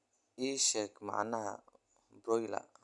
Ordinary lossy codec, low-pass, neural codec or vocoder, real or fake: none; 10.8 kHz; none; real